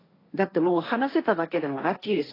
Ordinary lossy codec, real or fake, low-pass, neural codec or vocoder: AAC, 24 kbps; fake; 5.4 kHz; codec, 16 kHz, 1.1 kbps, Voila-Tokenizer